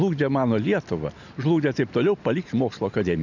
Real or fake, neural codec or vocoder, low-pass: real; none; 7.2 kHz